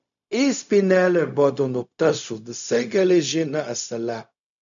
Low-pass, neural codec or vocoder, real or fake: 7.2 kHz; codec, 16 kHz, 0.4 kbps, LongCat-Audio-Codec; fake